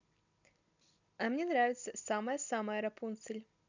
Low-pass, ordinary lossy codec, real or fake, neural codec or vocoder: 7.2 kHz; none; real; none